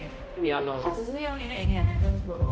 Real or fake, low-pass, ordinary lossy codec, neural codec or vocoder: fake; none; none; codec, 16 kHz, 0.5 kbps, X-Codec, HuBERT features, trained on balanced general audio